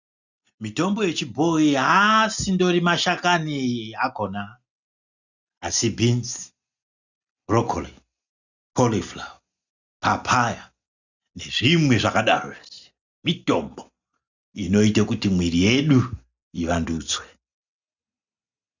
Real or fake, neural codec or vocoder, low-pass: real; none; 7.2 kHz